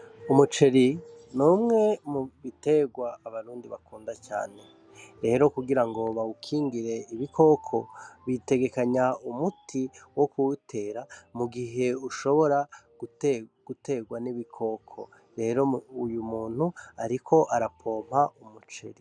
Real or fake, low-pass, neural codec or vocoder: real; 9.9 kHz; none